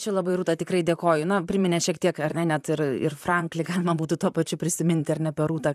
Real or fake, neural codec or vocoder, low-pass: fake; vocoder, 44.1 kHz, 128 mel bands, Pupu-Vocoder; 14.4 kHz